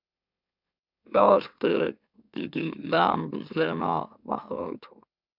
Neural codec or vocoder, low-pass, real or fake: autoencoder, 44.1 kHz, a latent of 192 numbers a frame, MeloTTS; 5.4 kHz; fake